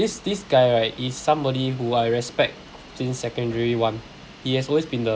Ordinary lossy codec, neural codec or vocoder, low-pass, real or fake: none; none; none; real